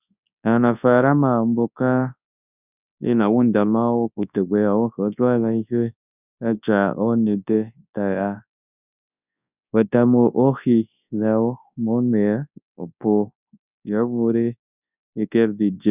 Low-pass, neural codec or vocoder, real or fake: 3.6 kHz; codec, 24 kHz, 0.9 kbps, WavTokenizer, large speech release; fake